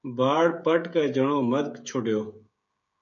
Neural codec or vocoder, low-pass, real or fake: codec, 16 kHz, 16 kbps, FreqCodec, smaller model; 7.2 kHz; fake